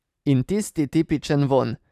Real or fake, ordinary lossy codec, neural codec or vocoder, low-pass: fake; none; vocoder, 44.1 kHz, 128 mel bands every 512 samples, BigVGAN v2; 14.4 kHz